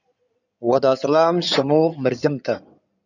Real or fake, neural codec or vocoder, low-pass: fake; codec, 16 kHz in and 24 kHz out, 2.2 kbps, FireRedTTS-2 codec; 7.2 kHz